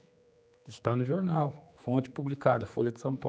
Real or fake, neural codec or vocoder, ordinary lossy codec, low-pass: fake; codec, 16 kHz, 2 kbps, X-Codec, HuBERT features, trained on general audio; none; none